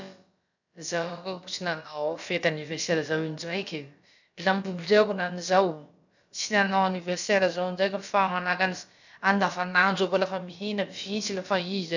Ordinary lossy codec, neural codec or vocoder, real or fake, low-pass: none; codec, 16 kHz, about 1 kbps, DyCAST, with the encoder's durations; fake; 7.2 kHz